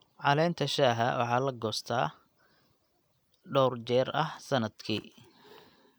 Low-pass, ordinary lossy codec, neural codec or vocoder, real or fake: none; none; none; real